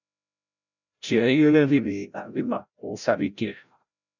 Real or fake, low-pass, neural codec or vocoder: fake; 7.2 kHz; codec, 16 kHz, 0.5 kbps, FreqCodec, larger model